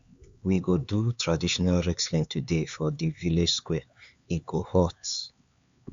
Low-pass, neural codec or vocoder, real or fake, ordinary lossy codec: 7.2 kHz; codec, 16 kHz, 4 kbps, X-Codec, HuBERT features, trained on LibriSpeech; fake; Opus, 64 kbps